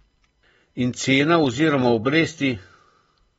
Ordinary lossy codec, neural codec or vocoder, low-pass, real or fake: AAC, 24 kbps; none; 19.8 kHz; real